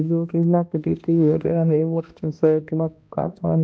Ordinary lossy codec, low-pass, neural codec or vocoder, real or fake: none; none; codec, 16 kHz, 1 kbps, X-Codec, HuBERT features, trained on balanced general audio; fake